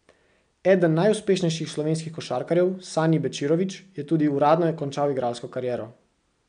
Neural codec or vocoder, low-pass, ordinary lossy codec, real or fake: none; 9.9 kHz; MP3, 96 kbps; real